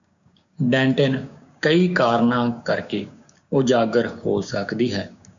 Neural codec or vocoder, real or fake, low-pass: codec, 16 kHz, 6 kbps, DAC; fake; 7.2 kHz